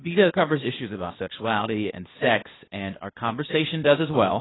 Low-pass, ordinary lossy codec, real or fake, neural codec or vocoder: 7.2 kHz; AAC, 16 kbps; fake; codec, 16 kHz, 0.8 kbps, ZipCodec